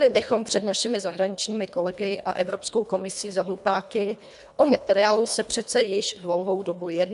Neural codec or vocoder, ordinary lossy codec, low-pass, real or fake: codec, 24 kHz, 1.5 kbps, HILCodec; AAC, 96 kbps; 10.8 kHz; fake